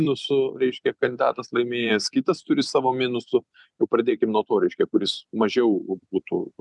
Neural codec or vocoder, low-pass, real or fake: vocoder, 44.1 kHz, 128 mel bands every 256 samples, BigVGAN v2; 10.8 kHz; fake